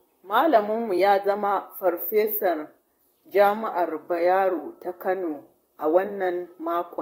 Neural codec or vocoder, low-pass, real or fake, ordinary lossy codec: vocoder, 44.1 kHz, 128 mel bands, Pupu-Vocoder; 19.8 kHz; fake; AAC, 48 kbps